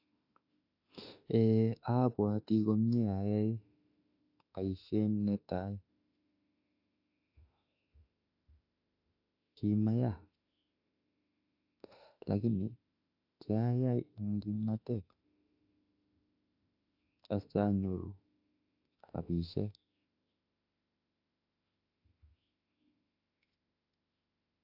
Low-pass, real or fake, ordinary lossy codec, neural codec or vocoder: 5.4 kHz; fake; AAC, 32 kbps; autoencoder, 48 kHz, 32 numbers a frame, DAC-VAE, trained on Japanese speech